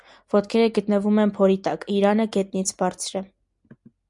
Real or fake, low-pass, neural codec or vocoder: real; 10.8 kHz; none